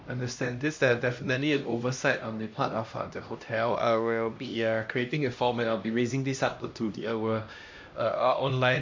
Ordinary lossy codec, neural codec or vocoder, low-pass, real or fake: MP3, 48 kbps; codec, 16 kHz, 1 kbps, X-Codec, HuBERT features, trained on LibriSpeech; 7.2 kHz; fake